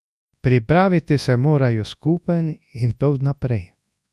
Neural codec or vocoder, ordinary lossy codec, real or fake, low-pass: codec, 24 kHz, 0.9 kbps, WavTokenizer, large speech release; none; fake; none